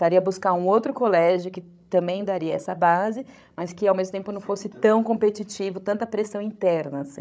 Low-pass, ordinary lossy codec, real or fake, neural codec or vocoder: none; none; fake; codec, 16 kHz, 16 kbps, FreqCodec, larger model